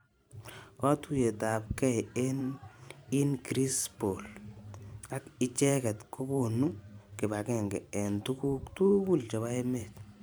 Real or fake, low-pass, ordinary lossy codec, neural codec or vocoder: fake; none; none; vocoder, 44.1 kHz, 128 mel bands every 512 samples, BigVGAN v2